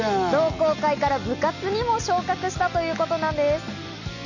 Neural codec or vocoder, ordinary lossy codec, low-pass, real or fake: none; none; 7.2 kHz; real